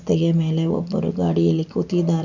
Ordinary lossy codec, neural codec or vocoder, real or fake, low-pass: none; none; real; 7.2 kHz